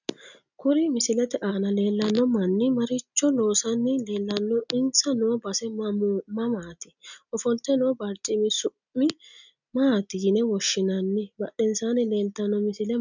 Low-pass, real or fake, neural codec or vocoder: 7.2 kHz; real; none